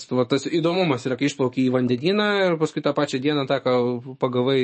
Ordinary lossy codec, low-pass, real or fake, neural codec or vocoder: MP3, 32 kbps; 10.8 kHz; fake; autoencoder, 48 kHz, 128 numbers a frame, DAC-VAE, trained on Japanese speech